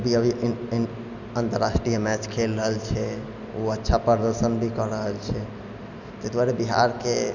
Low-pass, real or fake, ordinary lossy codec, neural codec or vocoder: 7.2 kHz; real; none; none